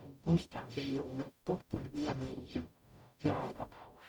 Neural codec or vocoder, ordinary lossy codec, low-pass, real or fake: codec, 44.1 kHz, 0.9 kbps, DAC; none; 19.8 kHz; fake